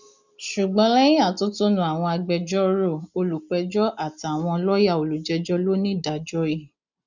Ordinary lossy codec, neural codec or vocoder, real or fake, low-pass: none; none; real; 7.2 kHz